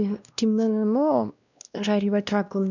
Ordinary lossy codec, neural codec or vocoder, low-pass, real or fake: none; codec, 16 kHz, 1 kbps, X-Codec, WavLM features, trained on Multilingual LibriSpeech; 7.2 kHz; fake